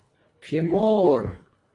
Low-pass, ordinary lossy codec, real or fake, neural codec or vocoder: 10.8 kHz; AAC, 32 kbps; fake; codec, 24 kHz, 1.5 kbps, HILCodec